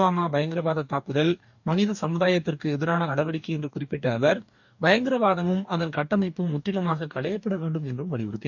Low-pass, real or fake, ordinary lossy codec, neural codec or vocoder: 7.2 kHz; fake; none; codec, 44.1 kHz, 2.6 kbps, DAC